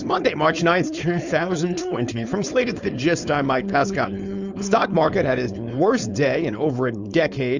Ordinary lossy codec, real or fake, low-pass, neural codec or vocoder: Opus, 64 kbps; fake; 7.2 kHz; codec, 16 kHz, 4.8 kbps, FACodec